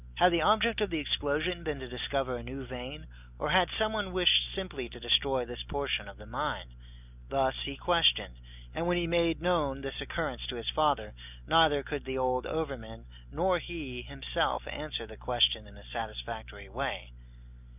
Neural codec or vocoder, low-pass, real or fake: none; 3.6 kHz; real